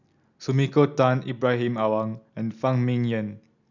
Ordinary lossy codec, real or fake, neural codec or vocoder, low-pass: none; real; none; 7.2 kHz